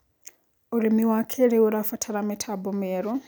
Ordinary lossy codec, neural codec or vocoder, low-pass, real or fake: none; none; none; real